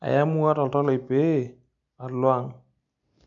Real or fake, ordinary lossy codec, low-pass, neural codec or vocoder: real; MP3, 96 kbps; 7.2 kHz; none